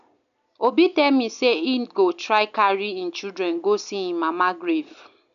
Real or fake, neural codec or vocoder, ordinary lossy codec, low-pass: real; none; none; 7.2 kHz